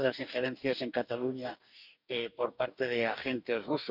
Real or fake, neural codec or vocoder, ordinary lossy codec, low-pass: fake; codec, 44.1 kHz, 2.6 kbps, DAC; none; 5.4 kHz